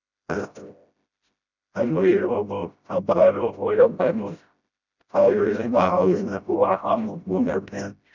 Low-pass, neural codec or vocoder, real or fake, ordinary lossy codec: 7.2 kHz; codec, 16 kHz, 0.5 kbps, FreqCodec, smaller model; fake; none